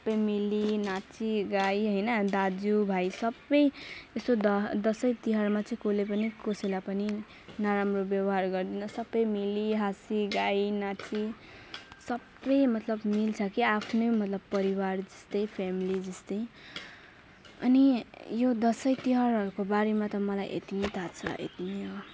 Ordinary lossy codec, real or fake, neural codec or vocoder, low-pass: none; real; none; none